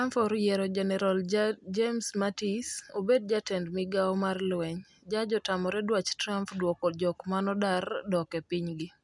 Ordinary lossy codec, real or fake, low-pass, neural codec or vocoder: none; real; 10.8 kHz; none